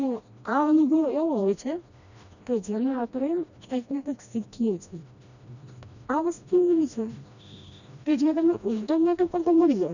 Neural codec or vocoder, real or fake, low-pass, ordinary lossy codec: codec, 16 kHz, 1 kbps, FreqCodec, smaller model; fake; 7.2 kHz; none